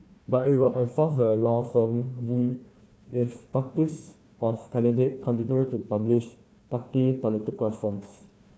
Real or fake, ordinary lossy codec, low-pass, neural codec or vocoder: fake; none; none; codec, 16 kHz, 1 kbps, FunCodec, trained on Chinese and English, 50 frames a second